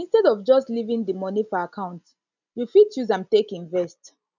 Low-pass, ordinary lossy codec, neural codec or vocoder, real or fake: 7.2 kHz; none; none; real